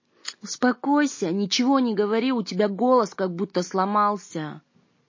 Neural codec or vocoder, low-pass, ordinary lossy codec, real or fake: none; 7.2 kHz; MP3, 32 kbps; real